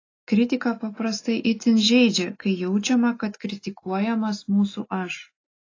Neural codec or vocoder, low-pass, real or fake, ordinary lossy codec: none; 7.2 kHz; real; AAC, 32 kbps